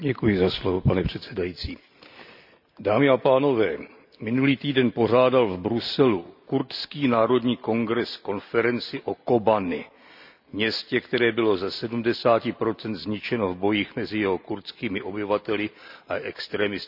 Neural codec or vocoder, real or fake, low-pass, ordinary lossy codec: none; real; 5.4 kHz; none